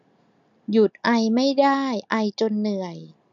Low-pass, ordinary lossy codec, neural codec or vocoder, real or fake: 7.2 kHz; none; none; real